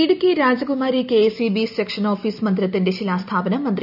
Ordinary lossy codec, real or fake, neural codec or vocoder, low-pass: none; real; none; 5.4 kHz